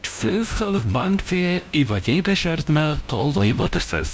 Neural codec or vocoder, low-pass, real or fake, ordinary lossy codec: codec, 16 kHz, 0.5 kbps, FunCodec, trained on LibriTTS, 25 frames a second; none; fake; none